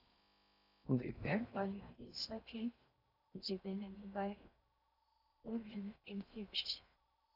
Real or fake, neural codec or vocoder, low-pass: fake; codec, 16 kHz in and 24 kHz out, 0.6 kbps, FocalCodec, streaming, 4096 codes; 5.4 kHz